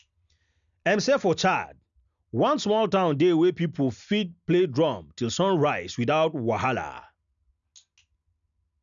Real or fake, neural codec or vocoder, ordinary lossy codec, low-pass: real; none; none; 7.2 kHz